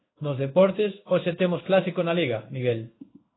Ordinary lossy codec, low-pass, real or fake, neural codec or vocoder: AAC, 16 kbps; 7.2 kHz; fake; codec, 16 kHz in and 24 kHz out, 1 kbps, XY-Tokenizer